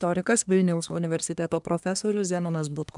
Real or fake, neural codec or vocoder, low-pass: fake; codec, 24 kHz, 1 kbps, SNAC; 10.8 kHz